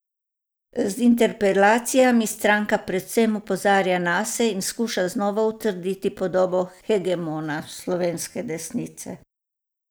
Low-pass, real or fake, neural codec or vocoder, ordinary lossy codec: none; real; none; none